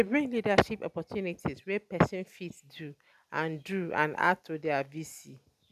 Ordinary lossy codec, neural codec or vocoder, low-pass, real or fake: none; none; 14.4 kHz; real